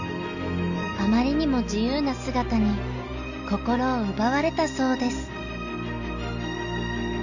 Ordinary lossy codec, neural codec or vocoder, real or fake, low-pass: none; none; real; 7.2 kHz